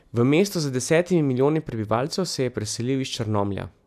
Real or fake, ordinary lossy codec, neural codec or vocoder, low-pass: real; none; none; 14.4 kHz